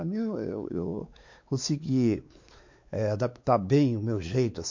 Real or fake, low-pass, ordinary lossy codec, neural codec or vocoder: fake; 7.2 kHz; MP3, 48 kbps; codec, 16 kHz, 4 kbps, X-Codec, WavLM features, trained on Multilingual LibriSpeech